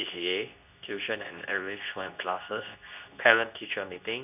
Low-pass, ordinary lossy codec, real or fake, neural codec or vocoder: 3.6 kHz; none; fake; codec, 24 kHz, 0.9 kbps, WavTokenizer, medium speech release version 2